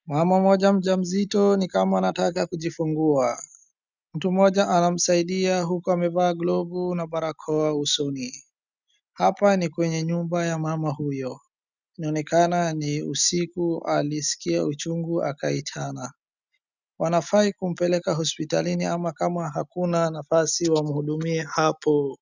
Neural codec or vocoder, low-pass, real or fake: none; 7.2 kHz; real